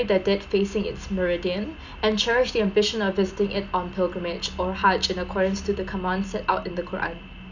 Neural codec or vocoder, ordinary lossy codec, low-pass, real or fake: none; none; 7.2 kHz; real